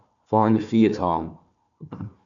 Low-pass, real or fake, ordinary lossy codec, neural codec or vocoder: 7.2 kHz; fake; MP3, 96 kbps; codec, 16 kHz, 1 kbps, FunCodec, trained on Chinese and English, 50 frames a second